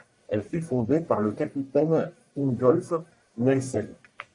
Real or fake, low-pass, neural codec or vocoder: fake; 10.8 kHz; codec, 44.1 kHz, 1.7 kbps, Pupu-Codec